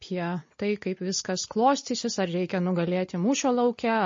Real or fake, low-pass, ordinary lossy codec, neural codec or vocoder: real; 7.2 kHz; MP3, 32 kbps; none